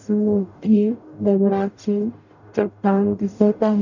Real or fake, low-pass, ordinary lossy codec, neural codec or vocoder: fake; 7.2 kHz; none; codec, 44.1 kHz, 0.9 kbps, DAC